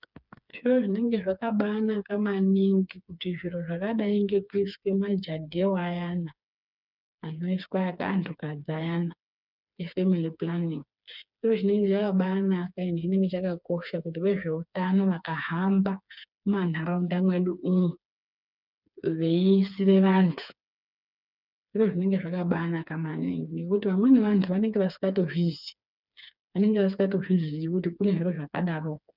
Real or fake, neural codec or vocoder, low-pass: fake; codec, 16 kHz, 4 kbps, FreqCodec, smaller model; 5.4 kHz